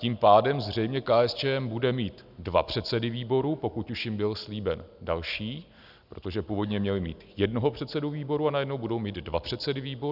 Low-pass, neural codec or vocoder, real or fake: 5.4 kHz; none; real